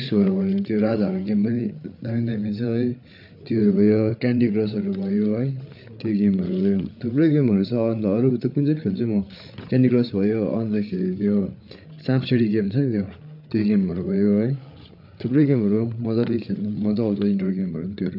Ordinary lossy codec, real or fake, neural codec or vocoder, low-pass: none; fake; codec, 16 kHz, 8 kbps, FreqCodec, larger model; 5.4 kHz